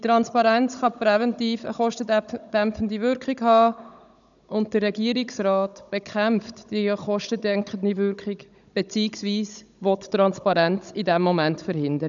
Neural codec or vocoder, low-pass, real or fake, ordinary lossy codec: codec, 16 kHz, 16 kbps, FunCodec, trained on Chinese and English, 50 frames a second; 7.2 kHz; fake; none